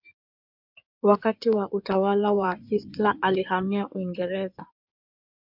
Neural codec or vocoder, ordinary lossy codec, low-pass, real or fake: codec, 16 kHz in and 24 kHz out, 2.2 kbps, FireRedTTS-2 codec; MP3, 48 kbps; 5.4 kHz; fake